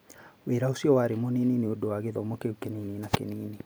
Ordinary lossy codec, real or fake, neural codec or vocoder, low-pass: none; real; none; none